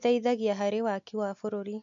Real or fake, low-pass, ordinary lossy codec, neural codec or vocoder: real; 7.2 kHz; MP3, 48 kbps; none